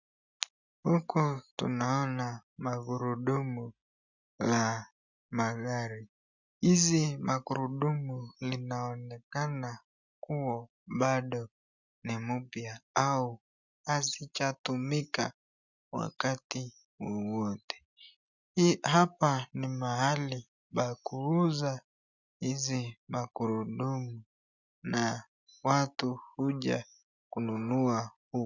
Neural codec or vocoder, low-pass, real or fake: none; 7.2 kHz; real